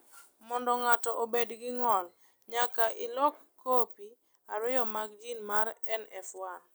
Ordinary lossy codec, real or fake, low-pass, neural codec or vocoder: none; real; none; none